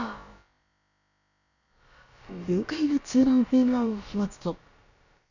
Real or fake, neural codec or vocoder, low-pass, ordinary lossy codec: fake; codec, 16 kHz, about 1 kbps, DyCAST, with the encoder's durations; 7.2 kHz; none